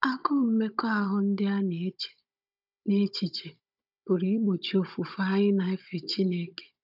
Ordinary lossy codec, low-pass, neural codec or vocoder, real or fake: none; 5.4 kHz; codec, 16 kHz, 16 kbps, FunCodec, trained on Chinese and English, 50 frames a second; fake